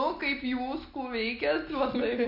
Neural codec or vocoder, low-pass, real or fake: none; 5.4 kHz; real